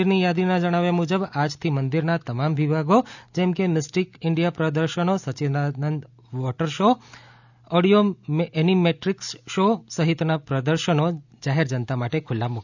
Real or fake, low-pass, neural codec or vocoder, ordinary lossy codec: real; 7.2 kHz; none; none